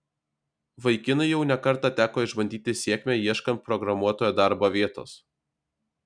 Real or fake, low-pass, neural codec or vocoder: real; 9.9 kHz; none